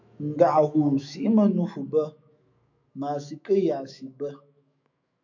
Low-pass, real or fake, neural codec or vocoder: 7.2 kHz; fake; autoencoder, 48 kHz, 128 numbers a frame, DAC-VAE, trained on Japanese speech